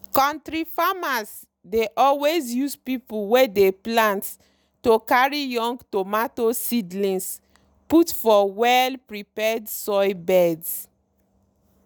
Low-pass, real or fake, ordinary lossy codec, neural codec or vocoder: none; real; none; none